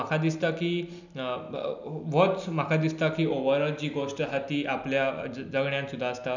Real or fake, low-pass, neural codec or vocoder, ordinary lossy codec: real; 7.2 kHz; none; none